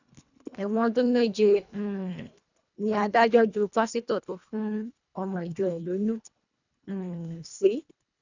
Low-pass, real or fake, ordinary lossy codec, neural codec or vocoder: 7.2 kHz; fake; none; codec, 24 kHz, 1.5 kbps, HILCodec